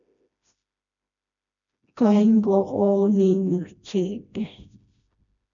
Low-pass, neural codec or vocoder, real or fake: 7.2 kHz; codec, 16 kHz, 1 kbps, FreqCodec, smaller model; fake